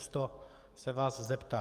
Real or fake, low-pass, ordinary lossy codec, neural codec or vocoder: fake; 14.4 kHz; Opus, 32 kbps; codec, 44.1 kHz, 7.8 kbps, DAC